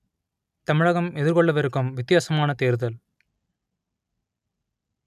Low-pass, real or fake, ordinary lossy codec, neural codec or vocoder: 14.4 kHz; real; none; none